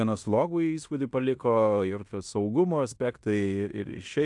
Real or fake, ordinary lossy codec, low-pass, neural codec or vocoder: fake; AAC, 64 kbps; 10.8 kHz; codec, 16 kHz in and 24 kHz out, 0.9 kbps, LongCat-Audio-Codec, fine tuned four codebook decoder